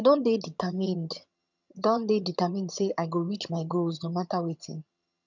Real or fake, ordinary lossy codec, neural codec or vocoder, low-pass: fake; none; vocoder, 22.05 kHz, 80 mel bands, HiFi-GAN; 7.2 kHz